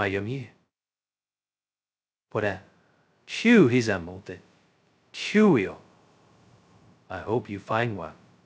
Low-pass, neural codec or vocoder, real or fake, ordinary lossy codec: none; codec, 16 kHz, 0.2 kbps, FocalCodec; fake; none